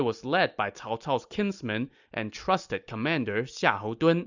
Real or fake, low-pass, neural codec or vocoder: real; 7.2 kHz; none